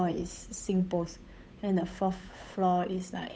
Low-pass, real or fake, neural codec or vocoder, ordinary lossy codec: none; fake; codec, 16 kHz, 8 kbps, FunCodec, trained on Chinese and English, 25 frames a second; none